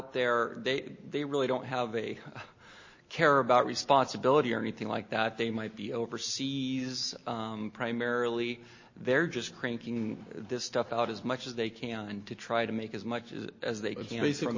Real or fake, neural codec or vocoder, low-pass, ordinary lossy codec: real; none; 7.2 kHz; MP3, 32 kbps